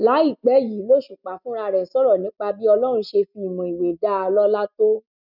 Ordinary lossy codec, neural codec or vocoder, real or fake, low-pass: none; none; real; 5.4 kHz